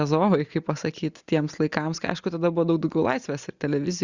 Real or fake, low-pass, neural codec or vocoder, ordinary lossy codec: real; 7.2 kHz; none; Opus, 64 kbps